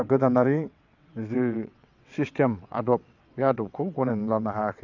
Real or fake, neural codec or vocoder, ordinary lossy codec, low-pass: fake; vocoder, 22.05 kHz, 80 mel bands, WaveNeXt; none; 7.2 kHz